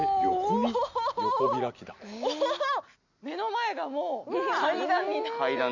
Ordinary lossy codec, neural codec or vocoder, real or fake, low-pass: none; none; real; 7.2 kHz